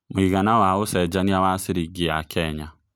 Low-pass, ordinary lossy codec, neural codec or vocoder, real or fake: 19.8 kHz; none; none; real